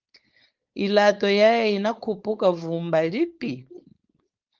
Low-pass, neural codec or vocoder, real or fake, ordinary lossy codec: 7.2 kHz; codec, 16 kHz, 4.8 kbps, FACodec; fake; Opus, 32 kbps